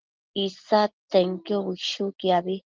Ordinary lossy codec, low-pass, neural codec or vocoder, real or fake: Opus, 16 kbps; 7.2 kHz; none; real